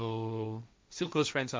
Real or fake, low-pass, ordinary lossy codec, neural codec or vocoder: fake; none; none; codec, 16 kHz, 1.1 kbps, Voila-Tokenizer